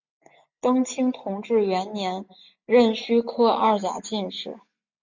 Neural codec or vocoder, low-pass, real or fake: none; 7.2 kHz; real